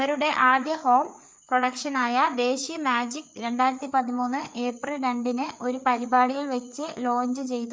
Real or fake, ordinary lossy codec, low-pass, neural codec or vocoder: fake; none; none; codec, 16 kHz, 4 kbps, FreqCodec, larger model